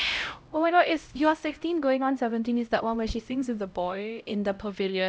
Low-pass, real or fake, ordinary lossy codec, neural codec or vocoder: none; fake; none; codec, 16 kHz, 0.5 kbps, X-Codec, HuBERT features, trained on LibriSpeech